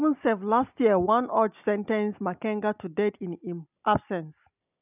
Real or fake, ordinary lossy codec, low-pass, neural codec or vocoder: real; none; 3.6 kHz; none